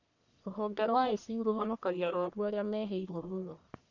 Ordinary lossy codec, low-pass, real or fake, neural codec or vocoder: none; 7.2 kHz; fake; codec, 44.1 kHz, 1.7 kbps, Pupu-Codec